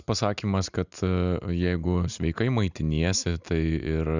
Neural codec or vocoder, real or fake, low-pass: none; real; 7.2 kHz